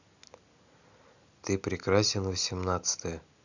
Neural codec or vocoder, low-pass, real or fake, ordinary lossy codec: none; 7.2 kHz; real; none